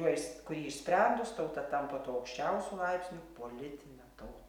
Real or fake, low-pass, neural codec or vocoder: real; 19.8 kHz; none